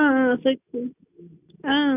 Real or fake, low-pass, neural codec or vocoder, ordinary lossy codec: real; 3.6 kHz; none; none